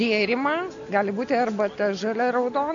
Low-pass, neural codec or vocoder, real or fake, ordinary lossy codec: 7.2 kHz; none; real; AAC, 64 kbps